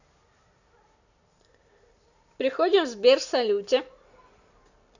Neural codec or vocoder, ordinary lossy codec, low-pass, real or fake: none; none; 7.2 kHz; real